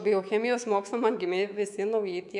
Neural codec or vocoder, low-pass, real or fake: codec, 24 kHz, 3.1 kbps, DualCodec; 10.8 kHz; fake